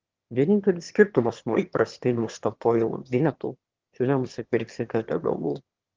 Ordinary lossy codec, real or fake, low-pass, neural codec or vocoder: Opus, 16 kbps; fake; 7.2 kHz; autoencoder, 22.05 kHz, a latent of 192 numbers a frame, VITS, trained on one speaker